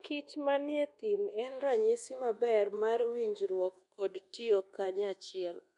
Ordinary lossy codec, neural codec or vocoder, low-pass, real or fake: MP3, 64 kbps; codec, 24 kHz, 1.2 kbps, DualCodec; 10.8 kHz; fake